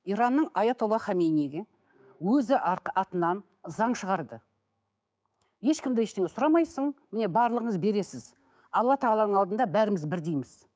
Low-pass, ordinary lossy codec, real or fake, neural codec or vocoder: none; none; fake; codec, 16 kHz, 6 kbps, DAC